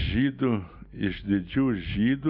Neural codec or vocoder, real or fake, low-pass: none; real; 5.4 kHz